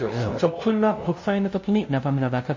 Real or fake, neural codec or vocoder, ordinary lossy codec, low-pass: fake; codec, 16 kHz, 0.5 kbps, FunCodec, trained on LibriTTS, 25 frames a second; MP3, 32 kbps; 7.2 kHz